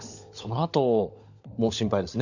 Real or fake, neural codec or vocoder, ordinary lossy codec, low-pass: fake; codec, 16 kHz, 16 kbps, FunCodec, trained on LibriTTS, 50 frames a second; none; 7.2 kHz